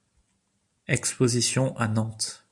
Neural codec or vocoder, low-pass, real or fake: none; 10.8 kHz; real